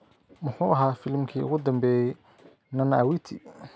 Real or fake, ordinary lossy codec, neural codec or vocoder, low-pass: real; none; none; none